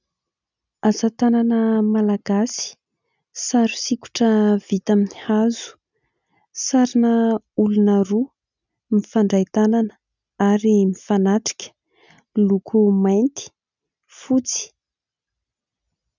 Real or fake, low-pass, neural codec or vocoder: real; 7.2 kHz; none